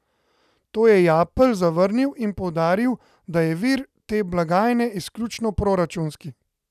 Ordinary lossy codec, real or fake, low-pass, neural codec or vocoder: none; real; 14.4 kHz; none